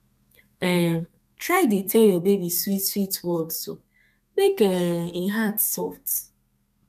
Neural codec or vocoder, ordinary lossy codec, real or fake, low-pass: codec, 32 kHz, 1.9 kbps, SNAC; none; fake; 14.4 kHz